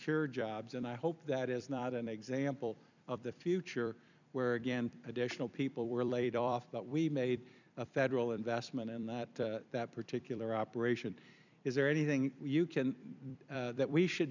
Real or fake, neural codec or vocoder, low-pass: fake; vocoder, 44.1 kHz, 128 mel bands every 256 samples, BigVGAN v2; 7.2 kHz